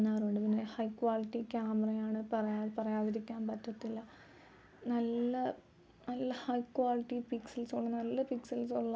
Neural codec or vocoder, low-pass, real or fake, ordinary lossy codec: none; none; real; none